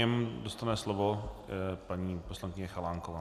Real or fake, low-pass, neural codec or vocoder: real; 14.4 kHz; none